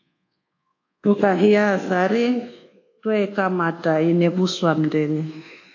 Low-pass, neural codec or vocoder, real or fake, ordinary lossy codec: 7.2 kHz; codec, 24 kHz, 1.2 kbps, DualCodec; fake; AAC, 48 kbps